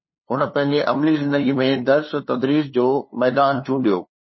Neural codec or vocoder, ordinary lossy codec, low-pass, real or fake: codec, 16 kHz, 2 kbps, FunCodec, trained on LibriTTS, 25 frames a second; MP3, 24 kbps; 7.2 kHz; fake